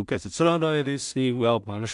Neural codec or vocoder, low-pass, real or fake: codec, 16 kHz in and 24 kHz out, 0.4 kbps, LongCat-Audio-Codec, two codebook decoder; 10.8 kHz; fake